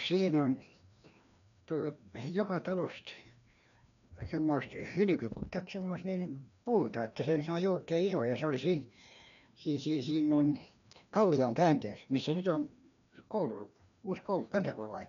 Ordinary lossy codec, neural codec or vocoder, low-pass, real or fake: none; codec, 16 kHz, 1 kbps, FreqCodec, larger model; 7.2 kHz; fake